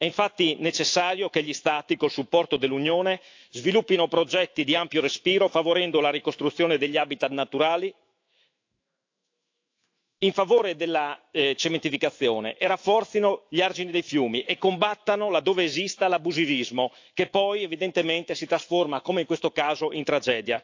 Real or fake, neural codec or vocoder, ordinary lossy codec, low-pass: fake; autoencoder, 48 kHz, 128 numbers a frame, DAC-VAE, trained on Japanese speech; AAC, 48 kbps; 7.2 kHz